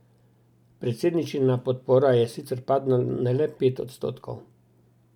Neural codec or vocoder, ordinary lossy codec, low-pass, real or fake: none; none; 19.8 kHz; real